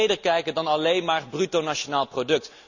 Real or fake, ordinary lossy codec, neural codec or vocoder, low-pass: real; none; none; 7.2 kHz